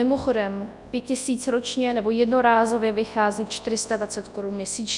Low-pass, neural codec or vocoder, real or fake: 10.8 kHz; codec, 24 kHz, 0.9 kbps, WavTokenizer, large speech release; fake